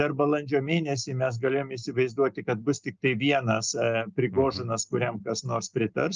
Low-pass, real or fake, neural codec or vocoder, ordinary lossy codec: 7.2 kHz; real; none; Opus, 24 kbps